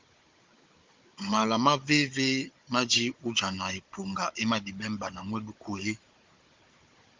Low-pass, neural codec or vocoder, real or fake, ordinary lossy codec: 7.2 kHz; codec, 16 kHz, 16 kbps, FunCodec, trained on Chinese and English, 50 frames a second; fake; Opus, 32 kbps